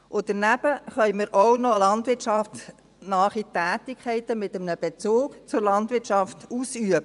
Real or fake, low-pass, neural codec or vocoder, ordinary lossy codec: fake; 10.8 kHz; vocoder, 24 kHz, 100 mel bands, Vocos; none